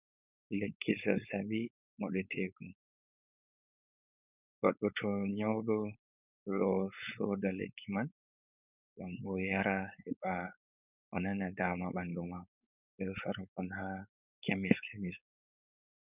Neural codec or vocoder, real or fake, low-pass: codec, 16 kHz, 4.8 kbps, FACodec; fake; 3.6 kHz